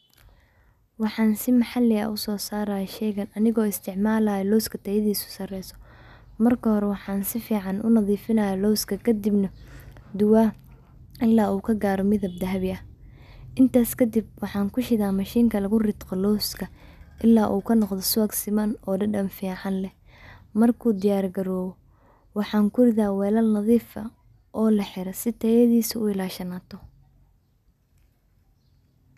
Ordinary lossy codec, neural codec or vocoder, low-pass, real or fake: none; none; 14.4 kHz; real